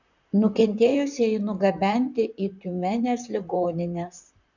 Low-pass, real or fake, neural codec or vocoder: 7.2 kHz; fake; vocoder, 44.1 kHz, 128 mel bands, Pupu-Vocoder